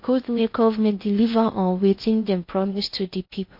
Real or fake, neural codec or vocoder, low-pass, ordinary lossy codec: fake; codec, 16 kHz in and 24 kHz out, 0.6 kbps, FocalCodec, streaming, 2048 codes; 5.4 kHz; MP3, 32 kbps